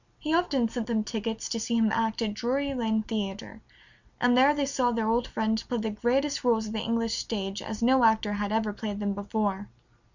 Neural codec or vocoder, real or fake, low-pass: none; real; 7.2 kHz